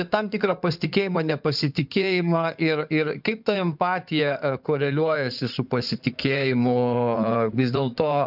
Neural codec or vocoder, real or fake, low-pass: codec, 16 kHz in and 24 kHz out, 2.2 kbps, FireRedTTS-2 codec; fake; 5.4 kHz